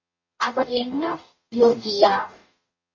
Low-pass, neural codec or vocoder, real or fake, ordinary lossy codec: 7.2 kHz; codec, 44.1 kHz, 0.9 kbps, DAC; fake; MP3, 32 kbps